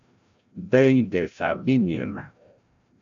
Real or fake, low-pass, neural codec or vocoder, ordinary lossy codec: fake; 7.2 kHz; codec, 16 kHz, 0.5 kbps, FreqCodec, larger model; AAC, 64 kbps